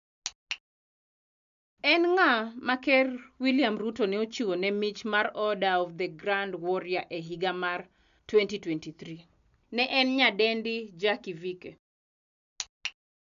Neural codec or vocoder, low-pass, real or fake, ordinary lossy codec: none; 7.2 kHz; real; none